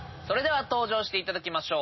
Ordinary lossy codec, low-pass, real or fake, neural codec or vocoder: MP3, 24 kbps; 7.2 kHz; real; none